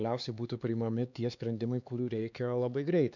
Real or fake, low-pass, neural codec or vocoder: fake; 7.2 kHz; codec, 16 kHz, 4 kbps, X-Codec, HuBERT features, trained on LibriSpeech